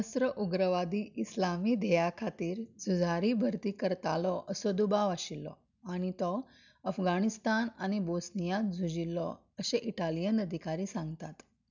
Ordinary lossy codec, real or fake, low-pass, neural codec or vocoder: none; real; 7.2 kHz; none